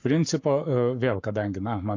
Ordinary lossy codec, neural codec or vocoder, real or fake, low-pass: AAC, 48 kbps; codec, 44.1 kHz, 7.8 kbps, Pupu-Codec; fake; 7.2 kHz